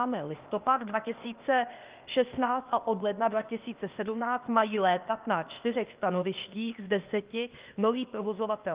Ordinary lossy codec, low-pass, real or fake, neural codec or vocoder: Opus, 32 kbps; 3.6 kHz; fake; codec, 16 kHz, 0.8 kbps, ZipCodec